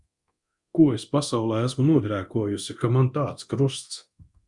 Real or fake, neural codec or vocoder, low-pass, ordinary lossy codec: fake; codec, 24 kHz, 0.9 kbps, DualCodec; 10.8 kHz; Opus, 64 kbps